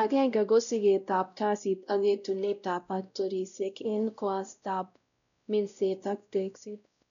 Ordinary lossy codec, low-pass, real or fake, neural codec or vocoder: none; 7.2 kHz; fake; codec, 16 kHz, 0.5 kbps, X-Codec, WavLM features, trained on Multilingual LibriSpeech